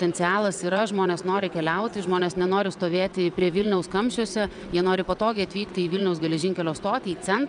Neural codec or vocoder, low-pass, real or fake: vocoder, 22.05 kHz, 80 mel bands, WaveNeXt; 9.9 kHz; fake